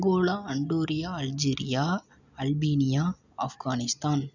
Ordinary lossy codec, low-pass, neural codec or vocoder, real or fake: none; 7.2 kHz; none; real